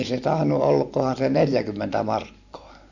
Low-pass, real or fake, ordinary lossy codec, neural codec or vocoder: 7.2 kHz; fake; none; vocoder, 44.1 kHz, 128 mel bands every 512 samples, BigVGAN v2